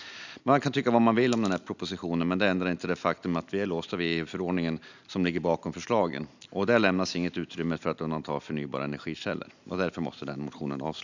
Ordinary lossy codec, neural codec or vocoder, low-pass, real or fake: none; none; 7.2 kHz; real